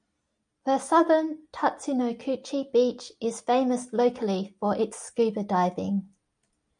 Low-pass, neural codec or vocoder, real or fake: 9.9 kHz; none; real